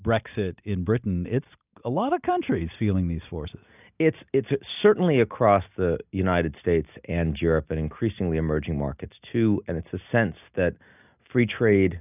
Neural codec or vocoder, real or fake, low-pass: none; real; 3.6 kHz